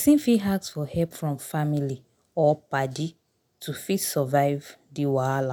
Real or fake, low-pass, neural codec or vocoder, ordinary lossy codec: real; none; none; none